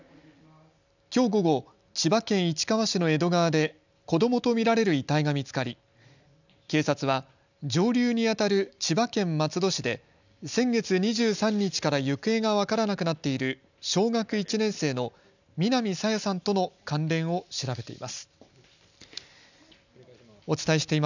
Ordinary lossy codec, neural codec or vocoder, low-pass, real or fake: none; none; 7.2 kHz; real